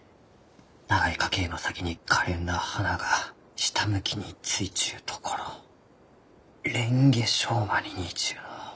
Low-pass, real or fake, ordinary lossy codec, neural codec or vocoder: none; real; none; none